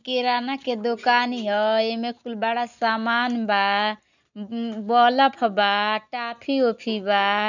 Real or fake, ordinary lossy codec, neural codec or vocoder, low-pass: real; none; none; 7.2 kHz